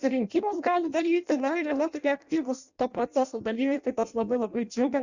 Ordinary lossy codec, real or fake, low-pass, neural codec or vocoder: Opus, 64 kbps; fake; 7.2 kHz; codec, 16 kHz in and 24 kHz out, 0.6 kbps, FireRedTTS-2 codec